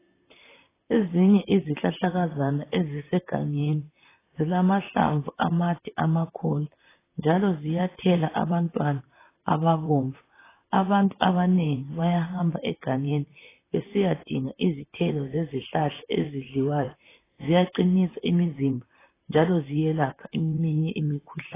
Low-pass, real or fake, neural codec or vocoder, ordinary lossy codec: 3.6 kHz; fake; vocoder, 44.1 kHz, 128 mel bands, Pupu-Vocoder; AAC, 16 kbps